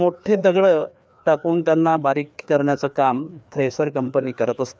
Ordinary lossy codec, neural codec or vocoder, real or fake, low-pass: none; codec, 16 kHz, 2 kbps, FreqCodec, larger model; fake; none